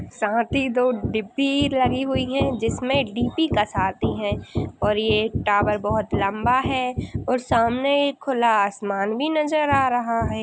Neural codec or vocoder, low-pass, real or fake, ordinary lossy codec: none; none; real; none